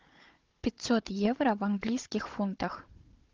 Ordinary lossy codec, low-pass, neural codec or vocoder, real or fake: Opus, 16 kbps; 7.2 kHz; codec, 16 kHz, 16 kbps, FunCodec, trained on Chinese and English, 50 frames a second; fake